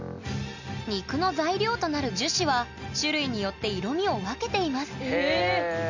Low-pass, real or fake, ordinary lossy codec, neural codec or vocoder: 7.2 kHz; real; none; none